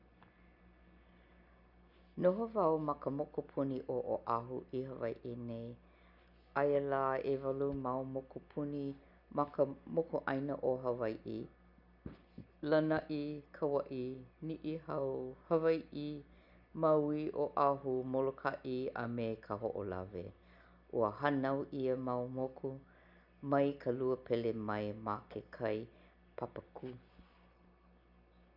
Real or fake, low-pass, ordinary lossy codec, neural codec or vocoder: real; 5.4 kHz; none; none